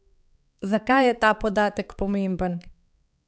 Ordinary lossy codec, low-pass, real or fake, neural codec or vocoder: none; none; fake; codec, 16 kHz, 4 kbps, X-Codec, HuBERT features, trained on balanced general audio